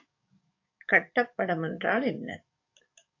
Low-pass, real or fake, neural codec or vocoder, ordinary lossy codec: 7.2 kHz; fake; codec, 44.1 kHz, 7.8 kbps, DAC; AAC, 48 kbps